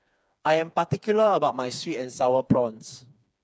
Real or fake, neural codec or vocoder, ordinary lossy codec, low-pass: fake; codec, 16 kHz, 4 kbps, FreqCodec, smaller model; none; none